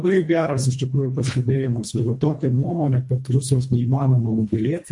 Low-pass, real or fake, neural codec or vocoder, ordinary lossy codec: 10.8 kHz; fake; codec, 24 kHz, 1.5 kbps, HILCodec; MP3, 48 kbps